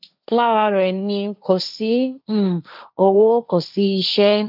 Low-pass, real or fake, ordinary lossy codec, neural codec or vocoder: 5.4 kHz; fake; none; codec, 16 kHz, 1.1 kbps, Voila-Tokenizer